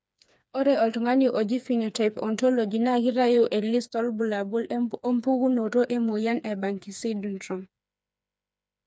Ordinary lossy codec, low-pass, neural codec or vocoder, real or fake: none; none; codec, 16 kHz, 4 kbps, FreqCodec, smaller model; fake